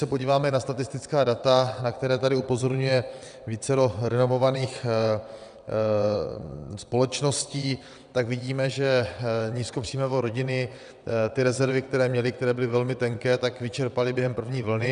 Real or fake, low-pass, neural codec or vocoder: fake; 9.9 kHz; vocoder, 22.05 kHz, 80 mel bands, WaveNeXt